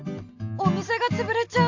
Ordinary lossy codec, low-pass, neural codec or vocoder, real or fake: AAC, 48 kbps; 7.2 kHz; none; real